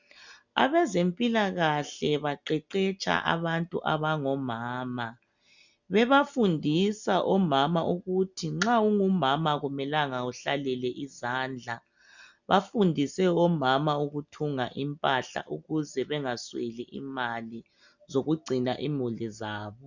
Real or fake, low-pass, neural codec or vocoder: real; 7.2 kHz; none